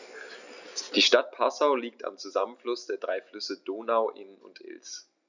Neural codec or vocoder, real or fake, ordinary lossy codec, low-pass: none; real; none; 7.2 kHz